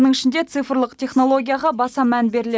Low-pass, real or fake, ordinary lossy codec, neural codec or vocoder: none; real; none; none